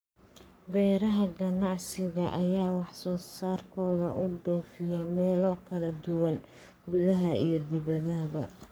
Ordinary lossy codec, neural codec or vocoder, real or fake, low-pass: none; codec, 44.1 kHz, 3.4 kbps, Pupu-Codec; fake; none